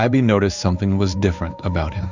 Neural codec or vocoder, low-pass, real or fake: codec, 16 kHz in and 24 kHz out, 1 kbps, XY-Tokenizer; 7.2 kHz; fake